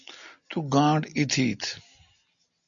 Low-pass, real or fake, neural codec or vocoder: 7.2 kHz; real; none